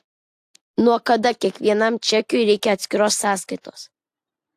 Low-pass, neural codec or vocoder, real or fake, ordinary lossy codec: 14.4 kHz; none; real; AAC, 64 kbps